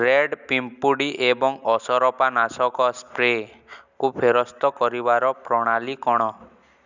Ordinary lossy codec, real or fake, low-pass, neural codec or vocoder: none; real; 7.2 kHz; none